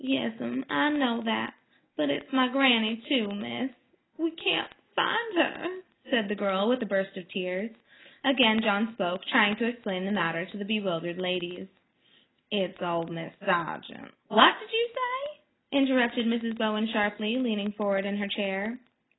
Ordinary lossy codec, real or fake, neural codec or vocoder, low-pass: AAC, 16 kbps; real; none; 7.2 kHz